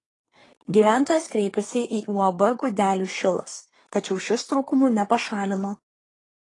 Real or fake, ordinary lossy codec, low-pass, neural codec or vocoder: fake; AAC, 32 kbps; 10.8 kHz; codec, 24 kHz, 1 kbps, SNAC